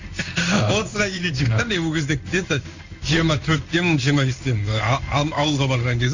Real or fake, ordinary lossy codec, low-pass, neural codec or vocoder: fake; Opus, 64 kbps; 7.2 kHz; codec, 16 kHz in and 24 kHz out, 1 kbps, XY-Tokenizer